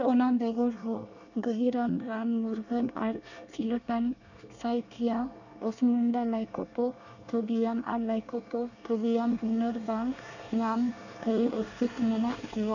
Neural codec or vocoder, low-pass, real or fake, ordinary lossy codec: codec, 24 kHz, 1 kbps, SNAC; 7.2 kHz; fake; none